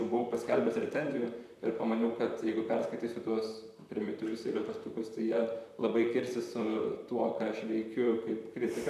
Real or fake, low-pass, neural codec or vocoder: fake; 14.4 kHz; vocoder, 44.1 kHz, 128 mel bands, Pupu-Vocoder